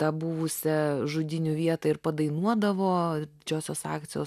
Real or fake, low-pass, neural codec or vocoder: real; 14.4 kHz; none